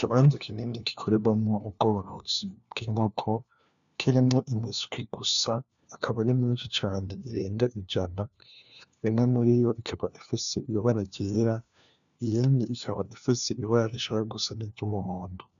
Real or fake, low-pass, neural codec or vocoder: fake; 7.2 kHz; codec, 16 kHz, 1 kbps, FunCodec, trained on LibriTTS, 50 frames a second